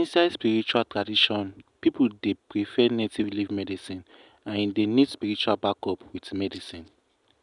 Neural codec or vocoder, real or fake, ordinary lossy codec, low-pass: none; real; none; 10.8 kHz